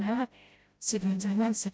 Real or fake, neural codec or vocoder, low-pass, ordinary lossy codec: fake; codec, 16 kHz, 0.5 kbps, FreqCodec, smaller model; none; none